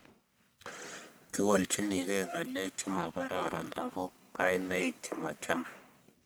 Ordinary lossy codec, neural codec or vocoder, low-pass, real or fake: none; codec, 44.1 kHz, 1.7 kbps, Pupu-Codec; none; fake